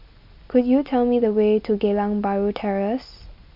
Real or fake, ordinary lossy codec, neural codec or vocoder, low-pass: real; MP3, 48 kbps; none; 5.4 kHz